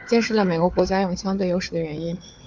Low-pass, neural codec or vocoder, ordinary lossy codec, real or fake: 7.2 kHz; codec, 16 kHz, 4 kbps, FunCodec, trained on Chinese and English, 50 frames a second; MP3, 64 kbps; fake